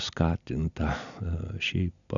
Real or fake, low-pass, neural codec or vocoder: real; 7.2 kHz; none